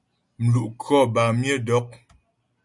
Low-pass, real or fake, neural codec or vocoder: 10.8 kHz; real; none